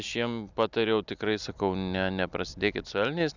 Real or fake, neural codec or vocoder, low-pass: real; none; 7.2 kHz